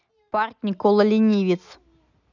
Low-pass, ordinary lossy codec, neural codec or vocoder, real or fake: 7.2 kHz; none; none; real